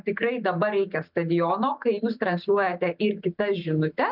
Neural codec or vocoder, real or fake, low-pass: none; real; 5.4 kHz